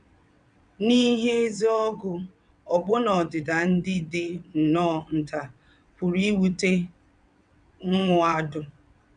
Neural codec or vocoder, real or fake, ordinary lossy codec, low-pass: vocoder, 22.05 kHz, 80 mel bands, WaveNeXt; fake; none; 9.9 kHz